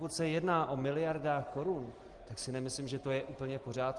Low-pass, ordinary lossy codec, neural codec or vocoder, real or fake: 10.8 kHz; Opus, 24 kbps; none; real